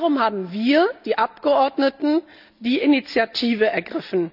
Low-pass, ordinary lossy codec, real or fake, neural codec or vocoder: 5.4 kHz; none; real; none